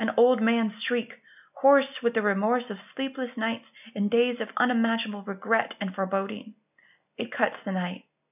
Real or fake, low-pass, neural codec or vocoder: real; 3.6 kHz; none